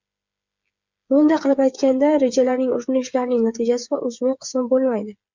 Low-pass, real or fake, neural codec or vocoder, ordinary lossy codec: 7.2 kHz; fake; codec, 16 kHz, 8 kbps, FreqCodec, smaller model; MP3, 64 kbps